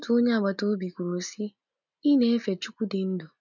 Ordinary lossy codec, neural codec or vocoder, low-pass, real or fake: none; none; 7.2 kHz; real